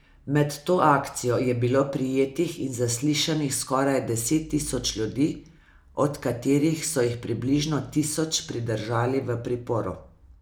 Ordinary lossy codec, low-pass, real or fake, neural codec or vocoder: none; none; real; none